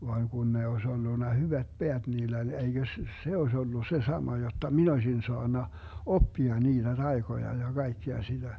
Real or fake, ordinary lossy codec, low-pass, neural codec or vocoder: real; none; none; none